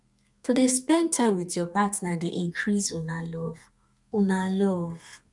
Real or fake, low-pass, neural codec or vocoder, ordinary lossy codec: fake; 10.8 kHz; codec, 32 kHz, 1.9 kbps, SNAC; none